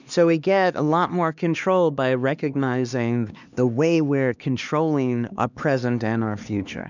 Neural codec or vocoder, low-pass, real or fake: codec, 16 kHz, 2 kbps, X-Codec, HuBERT features, trained on LibriSpeech; 7.2 kHz; fake